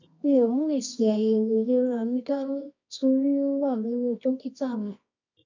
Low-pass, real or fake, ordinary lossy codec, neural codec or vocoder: 7.2 kHz; fake; MP3, 64 kbps; codec, 24 kHz, 0.9 kbps, WavTokenizer, medium music audio release